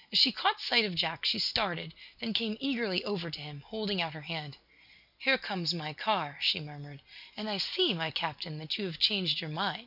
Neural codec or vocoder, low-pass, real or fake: none; 5.4 kHz; real